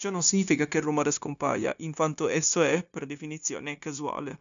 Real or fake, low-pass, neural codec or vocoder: fake; 7.2 kHz; codec, 16 kHz, 0.9 kbps, LongCat-Audio-Codec